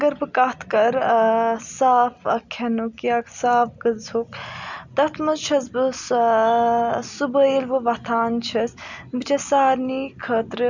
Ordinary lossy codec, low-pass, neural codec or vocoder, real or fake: none; 7.2 kHz; none; real